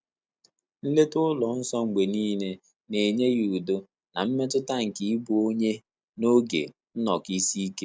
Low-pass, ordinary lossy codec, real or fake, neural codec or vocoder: none; none; real; none